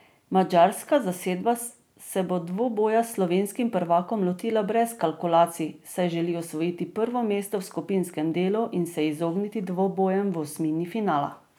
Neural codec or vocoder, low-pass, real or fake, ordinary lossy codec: none; none; real; none